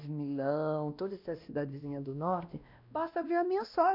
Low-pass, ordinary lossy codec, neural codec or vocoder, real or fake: 5.4 kHz; none; codec, 16 kHz, 1 kbps, X-Codec, WavLM features, trained on Multilingual LibriSpeech; fake